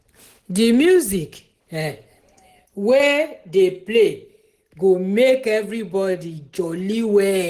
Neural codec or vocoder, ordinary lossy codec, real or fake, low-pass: none; Opus, 16 kbps; real; 14.4 kHz